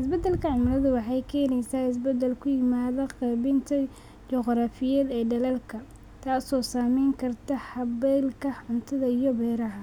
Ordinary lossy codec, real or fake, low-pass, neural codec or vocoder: none; real; 19.8 kHz; none